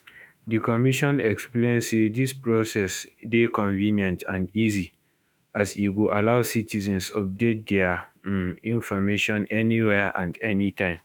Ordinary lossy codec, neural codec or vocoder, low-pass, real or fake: none; autoencoder, 48 kHz, 32 numbers a frame, DAC-VAE, trained on Japanese speech; 19.8 kHz; fake